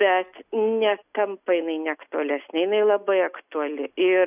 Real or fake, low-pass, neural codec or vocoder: real; 3.6 kHz; none